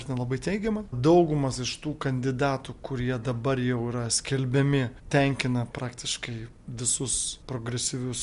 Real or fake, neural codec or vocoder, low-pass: real; none; 10.8 kHz